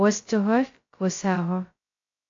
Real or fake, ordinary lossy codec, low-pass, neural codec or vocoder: fake; AAC, 48 kbps; 7.2 kHz; codec, 16 kHz, 0.2 kbps, FocalCodec